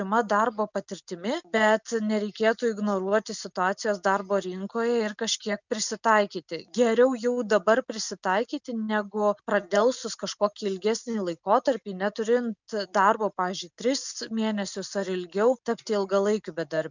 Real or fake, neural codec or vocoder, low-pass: real; none; 7.2 kHz